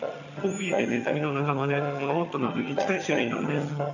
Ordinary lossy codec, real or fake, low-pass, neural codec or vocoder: none; fake; 7.2 kHz; vocoder, 22.05 kHz, 80 mel bands, HiFi-GAN